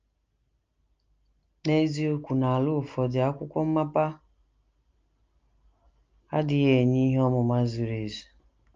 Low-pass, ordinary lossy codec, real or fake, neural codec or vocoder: 7.2 kHz; Opus, 24 kbps; real; none